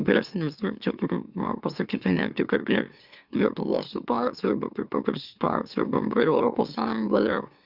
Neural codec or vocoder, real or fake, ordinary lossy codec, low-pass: autoencoder, 44.1 kHz, a latent of 192 numbers a frame, MeloTTS; fake; Opus, 64 kbps; 5.4 kHz